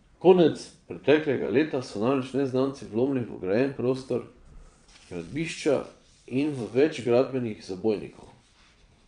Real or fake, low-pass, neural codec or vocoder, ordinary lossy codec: fake; 9.9 kHz; vocoder, 22.05 kHz, 80 mel bands, Vocos; MP3, 64 kbps